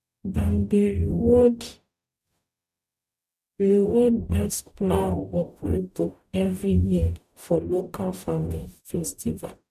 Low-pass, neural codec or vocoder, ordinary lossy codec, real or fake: 14.4 kHz; codec, 44.1 kHz, 0.9 kbps, DAC; none; fake